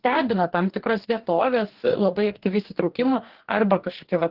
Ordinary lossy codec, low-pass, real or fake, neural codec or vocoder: Opus, 16 kbps; 5.4 kHz; fake; codec, 44.1 kHz, 2.6 kbps, DAC